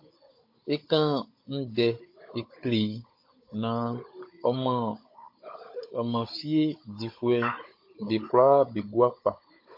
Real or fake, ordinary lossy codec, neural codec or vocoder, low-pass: fake; MP3, 32 kbps; codec, 16 kHz, 16 kbps, FunCodec, trained on Chinese and English, 50 frames a second; 5.4 kHz